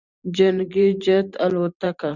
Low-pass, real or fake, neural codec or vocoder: 7.2 kHz; fake; vocoder, 44.1 kHz, 128 mel bands every 512 samples, BigVGAN v2